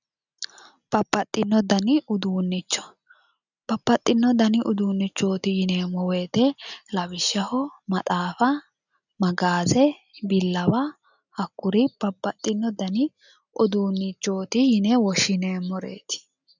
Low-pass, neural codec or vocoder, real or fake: 7.2 kHz; none; real